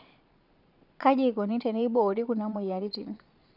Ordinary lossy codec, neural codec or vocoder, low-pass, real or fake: none; vocoder, 22.05 kHz, 80 mel bands, Vocos; 5.4 kHz; fake